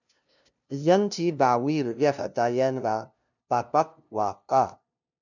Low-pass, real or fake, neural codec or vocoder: 7.2 kHz; fake; codec, 16 kHz, 0.5 kbps, FunCodec, trained on LibriTTS, 25 frames a second